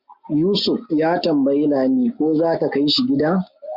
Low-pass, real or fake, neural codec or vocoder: 5.4 kHz; real; none